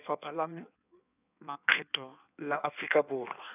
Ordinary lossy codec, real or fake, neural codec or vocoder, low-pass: none; fake; codec, 16 kHz in and 24 kHz out, 1.1 kbps, FireRedTTS-2 codec; 3.6 kHz